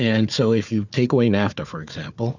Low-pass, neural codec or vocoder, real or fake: 7.2 kHz; codec, 16 kHz, 4 kbps, FunCodec, trained on Chinese and English, 50 frames a second; fake